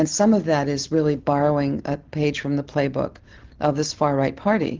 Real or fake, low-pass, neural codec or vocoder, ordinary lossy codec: real; 7.2 kHz; none; Opus, 16 kbps